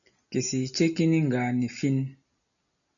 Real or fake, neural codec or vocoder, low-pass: real; none; 7.2 kHz